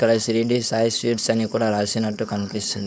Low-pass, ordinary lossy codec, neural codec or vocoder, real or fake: none; none; codec, 16 kHz, 4.8 kbps, FACodec; fake